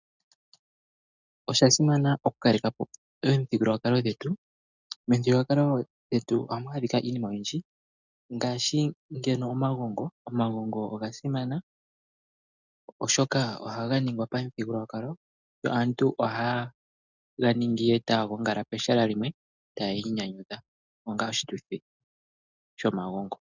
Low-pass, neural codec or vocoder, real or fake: 7.2 kHz; none; real